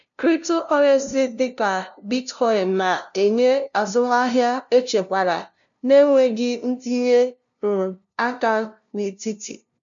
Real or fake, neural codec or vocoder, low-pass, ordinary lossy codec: fake; codec, 16 kHz, 0.5 kbps, FunCodec, trained on LibriTTS, 25 frames a second; 7.2 kHz; none